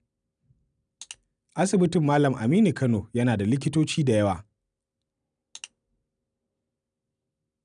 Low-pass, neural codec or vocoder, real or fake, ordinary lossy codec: 9.9 kHz; none; real; none